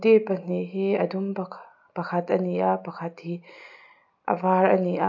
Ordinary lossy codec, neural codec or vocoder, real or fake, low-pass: AAC, 48 kbps; none; real; 7.2 kHz